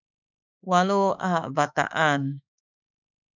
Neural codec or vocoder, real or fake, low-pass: autoencoder, 48 kHz, 32 numbers a frame, DAC-VAE, trained on Japanese speech; fake; 7.2 kHz